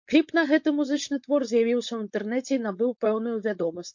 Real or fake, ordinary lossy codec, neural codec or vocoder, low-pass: fake; MP3, 48 kbps; codec, 16 kHz, 4.8 kbps, FACodec; 7.2 kHz